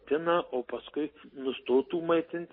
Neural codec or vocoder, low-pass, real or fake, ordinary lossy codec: none; 5.4 kHz; real; MP3, 24 kbps